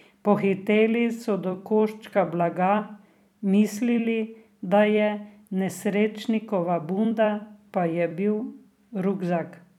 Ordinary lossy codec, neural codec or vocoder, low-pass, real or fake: none; vocoder, 44.1 kHz, 128 mel bands every 256 samples, BigVGAN v2; 19.8 kHz; fake